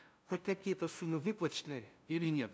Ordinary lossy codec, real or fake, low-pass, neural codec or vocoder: none; fake; none; codec, 16 kHz, 0.5 kbps, FunCodec, trained on LibriTTS, 25 frames a second